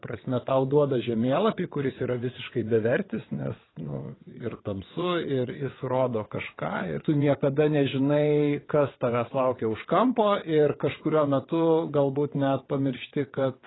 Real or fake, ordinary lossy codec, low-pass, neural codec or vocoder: fake; AAC, 16 kbps; 7.2 kHz; vocoder, 22.05 kHz, 80 mel bands, WaveNeXt